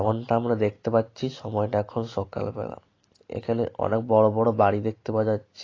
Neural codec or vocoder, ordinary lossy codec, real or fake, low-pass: none; AAC, 32 kbps; real; 7.2 kHz